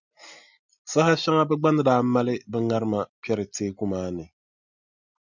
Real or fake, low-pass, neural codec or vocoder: real; 7.2 kHz; none